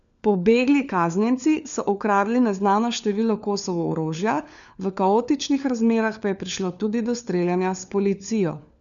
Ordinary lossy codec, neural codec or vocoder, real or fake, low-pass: none; codec, 16 kHz, 4 kbps, FunCodec, trained on LibriTTS, 50 frames a second; fake; 7.2 kHz